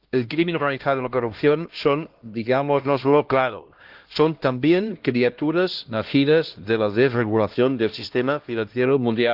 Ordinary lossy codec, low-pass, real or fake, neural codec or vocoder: Opus, 16 kbps; 5.4 kHz; fake; codec, 16 kHz, 1 kbps, X-Codec, HuBERT features, trained on LibriSpeech